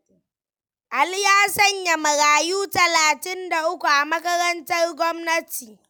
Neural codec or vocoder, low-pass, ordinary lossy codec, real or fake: none; none; none; real